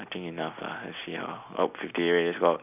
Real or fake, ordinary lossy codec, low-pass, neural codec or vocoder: real; none; 3.6 kHz; none